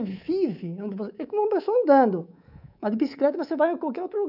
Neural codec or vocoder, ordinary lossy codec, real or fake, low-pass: codec, 24 kHz, 3.1 kbps, DualCodec; none; fake; 5.4 kHz